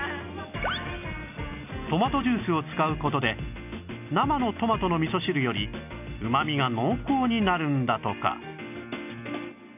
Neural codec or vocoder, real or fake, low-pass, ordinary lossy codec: none; real; 3.6 kHz; none